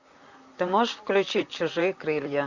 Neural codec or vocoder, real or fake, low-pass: vocoder, 44.1 kHz, 128 mel bands, Pupu-Vocoder; fake; 7.2 kHz